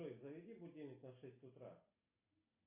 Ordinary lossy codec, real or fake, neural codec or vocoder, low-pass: AAC, 32 kbps; real; none; 3.6 kHz